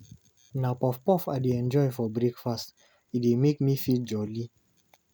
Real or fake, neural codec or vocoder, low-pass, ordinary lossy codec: real; none; none; none